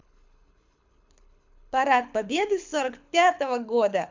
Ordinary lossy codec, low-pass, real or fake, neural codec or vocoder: MP3, 64 kbps; 7.2 kHz; fake; codec, 24 kHz, 6 kbps, HILCodec